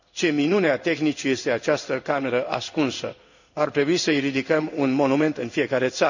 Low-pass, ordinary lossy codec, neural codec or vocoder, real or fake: 7.2 kHz; none; codec, 16 kHz in and 24 kHz out, 1 kbps, XY-Tokenizer; fake